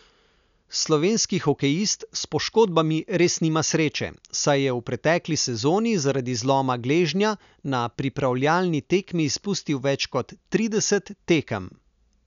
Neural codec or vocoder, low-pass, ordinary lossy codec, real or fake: none; 7.2 kHz; none; real